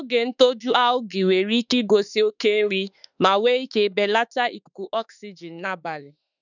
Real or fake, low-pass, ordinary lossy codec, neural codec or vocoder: fake; 7.2 kHz; none; autoencoder, 48 kHz, 32 numbers a frame, DAC-VAE, trained on Japanese speech